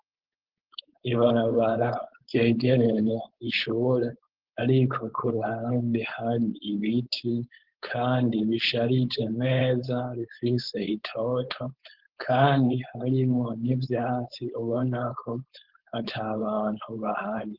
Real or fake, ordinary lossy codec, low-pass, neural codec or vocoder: fake; Opus, 16 kbps; 5.4 kHz; codec, 16 kHz, 4.8 kbps, FACodec